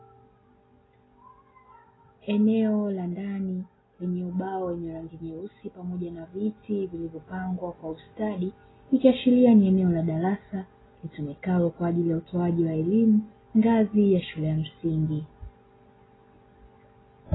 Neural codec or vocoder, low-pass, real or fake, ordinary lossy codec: none; 7.2 kHz; real; AAC, 16 kbps